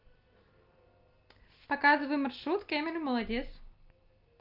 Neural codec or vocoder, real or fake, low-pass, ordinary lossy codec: none; real; 5.4 kHz; Opus, 24 kbps